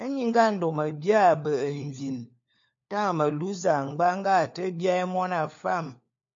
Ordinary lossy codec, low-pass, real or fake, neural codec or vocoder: MP3, 48 kbps; 7.2 kHz; fake; codec, 16 kHz, 4 kbps, FunCodec, trained on LibriTTS, 50 frames a second